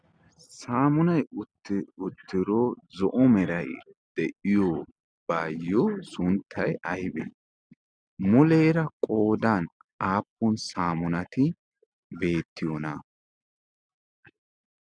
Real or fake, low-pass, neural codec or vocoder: real; 9.9 kHz; none